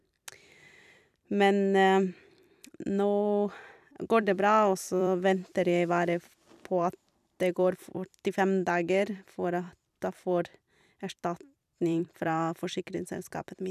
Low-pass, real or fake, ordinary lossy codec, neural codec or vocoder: 14.4 kHz; fake; none; vocoder, 44.1 kHz, 128 mel bands every 256 samples, BigVGAN v2